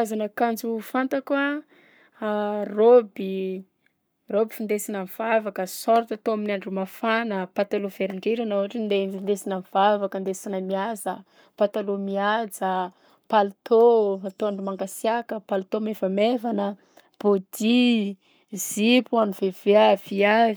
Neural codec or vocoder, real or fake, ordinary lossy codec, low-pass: codec, 44.1 kHz, 7.8 kbps, Pupu-Codec; fake; none; none